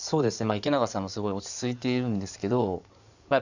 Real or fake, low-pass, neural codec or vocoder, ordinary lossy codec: fake; 7.2 kHz; codec, 16 kHz in and 24 kHz out, 2.2 kbps, FireRedTTS-2 codec; none